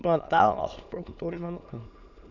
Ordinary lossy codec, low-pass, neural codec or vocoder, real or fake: none; 7.2 kHz; autoencoder, 22.05 kHz, a latent of 192 numbers a frame, VITS, trained on many speakers; fake